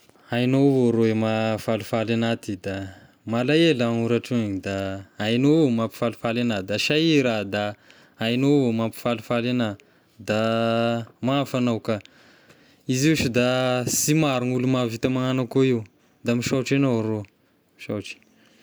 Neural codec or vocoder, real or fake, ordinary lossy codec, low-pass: none; real; none; none